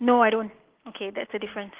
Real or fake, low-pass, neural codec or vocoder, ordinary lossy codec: real; 3.6 kHz; none; Opus, 16 kbps